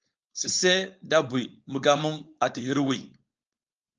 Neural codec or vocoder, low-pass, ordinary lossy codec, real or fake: codec, 16 kHz, 4.8 kbps, FACodec; 7.2 kHz; Opus, 24 kbps; fake